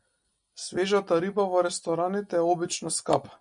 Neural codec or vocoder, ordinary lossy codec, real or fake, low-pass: none; MP3, 96 kbps; real; 9.9 kHz